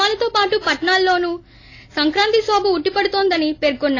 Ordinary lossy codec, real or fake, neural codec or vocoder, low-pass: AAC, 32 kbps; real; none; 7.2 kHz